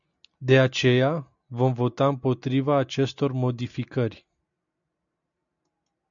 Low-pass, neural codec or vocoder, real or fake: 7.2 kHz; none; real